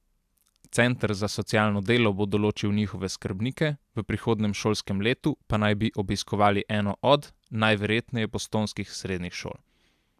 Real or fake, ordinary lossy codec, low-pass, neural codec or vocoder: real; AAC, 96 kbps; 14.4 kHz; none